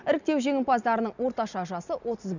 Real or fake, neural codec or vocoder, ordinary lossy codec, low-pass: real; none; none; 7.2 kHz